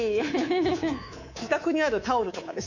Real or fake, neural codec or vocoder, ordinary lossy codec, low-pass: fake; codec, 24 kHz, 3.1 kbps, DualCodec; none; 7.2 kHz